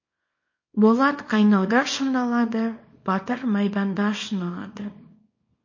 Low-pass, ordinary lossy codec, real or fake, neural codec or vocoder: 7.2 kHz; MP3, 32 kbps; fake; codec, 24 kHz, 0.9 kbps, WavTokenizer, small release